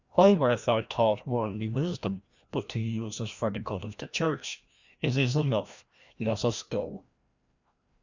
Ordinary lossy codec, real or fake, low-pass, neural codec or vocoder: Opus, 64 kbps; fake; 7.2 kHz; codec, 16 kHz, 1 kbps, FreqCodec, larger model